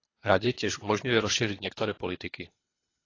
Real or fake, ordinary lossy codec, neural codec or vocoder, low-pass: fake; AAC, 32 kbps; codec, 24 kHz, 3 kbps, HILCodec; 7.2 kHz